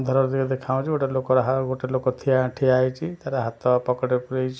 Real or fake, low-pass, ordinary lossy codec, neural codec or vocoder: real; none; none; none